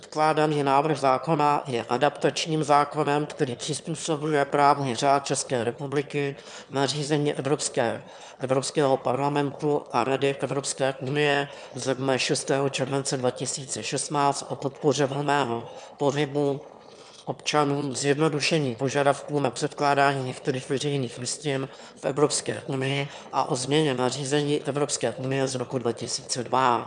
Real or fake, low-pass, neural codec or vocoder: fake; 9.9 kHz; autoencoder, 22.05 kHz, a latent of 192 numbers a frame, VITS, trained on one speaker